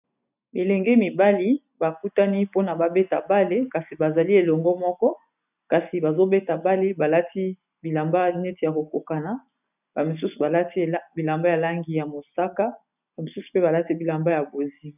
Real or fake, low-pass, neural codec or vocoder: real; 3.6 kHz; none